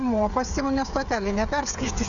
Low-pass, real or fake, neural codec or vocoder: 7.2 kHz; fake; codec, 16 kHz, 8 kbps, FreqCodec, smaller model